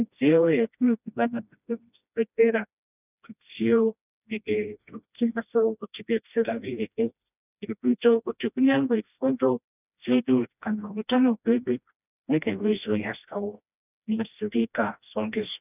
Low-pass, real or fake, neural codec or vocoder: 3.6 kHz; fake; codec, 16 kHz, 1 kbps, FreqCodec, smaller model